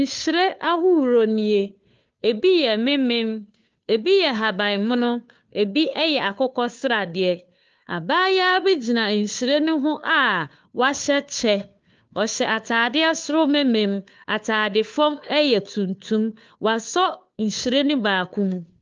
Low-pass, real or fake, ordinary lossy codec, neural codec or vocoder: 7.2 kHz; fake; Opus, 24 kbps; codec, 16 kHz, 2 kbps, FunCodec, trained on Chinese and English, 25 frames a second